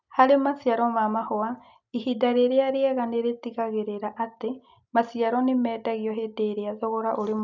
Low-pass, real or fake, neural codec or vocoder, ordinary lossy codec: 7.2 kHz; real; none; none